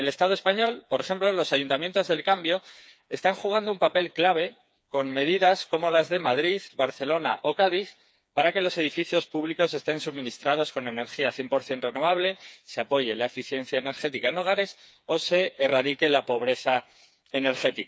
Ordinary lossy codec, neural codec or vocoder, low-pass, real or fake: none; codec, 16 kHz, 4 kbps, FreqCodec, smaller model; none; fake